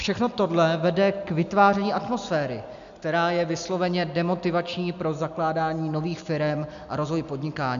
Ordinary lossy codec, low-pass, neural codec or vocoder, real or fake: AAC, 96 kbps; 7.2 kHz; none; real